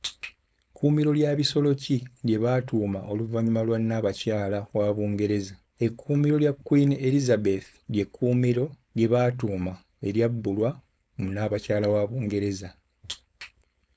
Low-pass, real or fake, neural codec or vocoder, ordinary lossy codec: none; fake; codec, 16 kHz, 4.8 kbps, FACodec; none